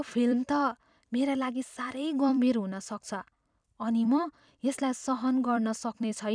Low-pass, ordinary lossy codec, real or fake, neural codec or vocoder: 9.9 kHz; none; fake; vocoder, 44.1 kHz, 128 mel bands every 256 samples, BigVGAN v2